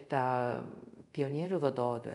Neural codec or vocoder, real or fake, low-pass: codec, 24 kHz, 0.5 kbps, DualCodec; fake; 10.8 kHz